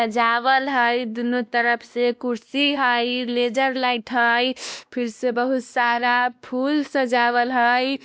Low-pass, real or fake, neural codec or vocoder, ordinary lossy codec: none; fake; codec, 16 kHz, 1 kbps, X-Codec, WavLM features, trained on Multilingual LibriSpeech; none